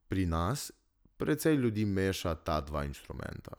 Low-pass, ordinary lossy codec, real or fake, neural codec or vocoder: none; none; real; none